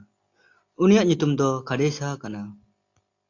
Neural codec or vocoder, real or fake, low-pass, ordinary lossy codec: none; real; 7.2 kHz; AAC, 48 kbps